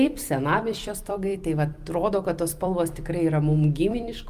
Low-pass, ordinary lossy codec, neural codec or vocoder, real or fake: 14.4 kHz; Opus, 32 kbps; vocoder, 44.1 kHz, 128 mel bands every 256 samples, BigVGAN v2; fake